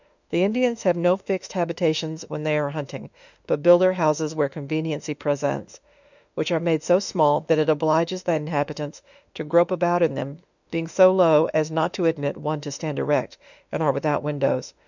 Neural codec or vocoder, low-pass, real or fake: autoencoder, 48 kHz, 32 numbers a frame, DAC-VAE, trained on Japanese speech; 7.2 kHz; fake